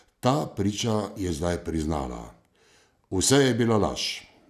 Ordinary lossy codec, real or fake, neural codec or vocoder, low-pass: none; real; none; 14.4 kHz